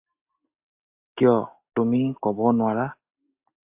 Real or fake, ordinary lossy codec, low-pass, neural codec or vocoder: real; AAC, 32 kbps; 3.6 kHz; none